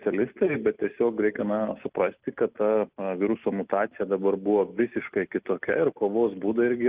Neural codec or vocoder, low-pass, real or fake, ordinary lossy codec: none; 3.6 kHz; real; Opus, 32 kbps